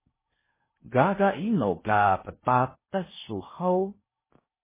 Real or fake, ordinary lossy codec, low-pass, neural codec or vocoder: fake; MP3, 16 kbps; 3.6 kHz; codec, 16 kHz in and 24 kHz out, 0.6 kbps, FocalCodec, streaming, 4096 codes